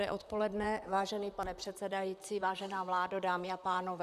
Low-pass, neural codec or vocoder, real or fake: 14.4 kHz; vocoder, 44.1 kHz, 128 mel bands, Pupu-Vocoder; fake